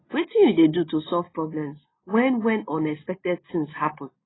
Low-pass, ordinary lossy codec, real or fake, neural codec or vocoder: 7.2 kHz; AAC, 16 kbps; real; none